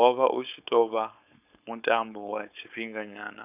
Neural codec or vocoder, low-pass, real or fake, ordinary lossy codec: codec, 16 kHz, 4.8 kbps, FACodec; 3.6 kHz; fake; none